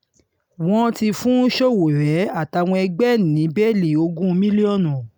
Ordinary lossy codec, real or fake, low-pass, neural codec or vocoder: none; real; none; none